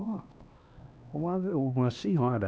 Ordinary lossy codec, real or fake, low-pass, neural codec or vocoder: none; fake; none; codec, 16 kHz, 2 kbps, X-Codec, HuBERT features, trained on LibriSpeech